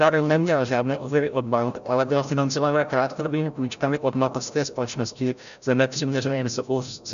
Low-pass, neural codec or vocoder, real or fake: 7.2 kHz; codec, 16 kHz, 0.5 kbps, FreqCodec, larger model; fake